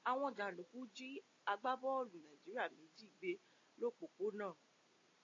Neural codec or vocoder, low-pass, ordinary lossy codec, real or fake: none; 7.2 kHz; AAC, 32 kbps; real